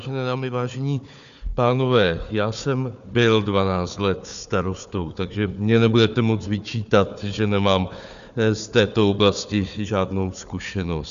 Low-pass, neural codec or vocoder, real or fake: 7.2 kHz; codec, 16 kHz, 4 kbps, FunCodec, trained on Chinese and English, 50 frames a second; fake